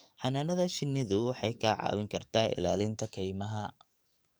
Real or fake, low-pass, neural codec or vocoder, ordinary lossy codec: fake; none; codec, 44.1 kHz, 7.8 kbps, DAC; none